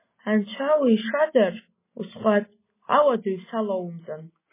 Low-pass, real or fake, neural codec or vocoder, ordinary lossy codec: 3.6 kHz; real; none; MP3, 16 kbps